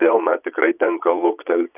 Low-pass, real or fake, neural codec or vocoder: 3.6 kHz; fake; vocoder, 22.05 kHz, 80 mel bands, Vocos